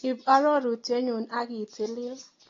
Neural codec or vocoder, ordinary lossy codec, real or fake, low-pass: none; AAC, 32 kbps; real; 7.2 kHz